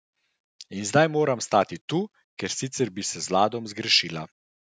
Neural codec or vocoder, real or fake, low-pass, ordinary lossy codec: none; real; none; none